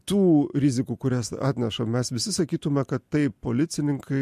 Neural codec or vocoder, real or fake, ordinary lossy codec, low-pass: none; real; MP3, 64 kbps; 14.4 kHz